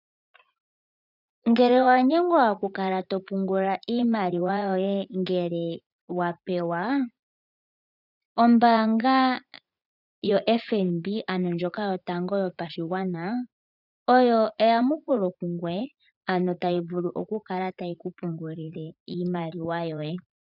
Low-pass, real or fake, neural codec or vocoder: 5.4 kHz; fake; vocoder, 44.1 kHz, 80 mel bands, Vocos